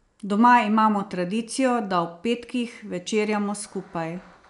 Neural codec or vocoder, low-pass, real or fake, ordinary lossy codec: none; 10.8 kHz; real; none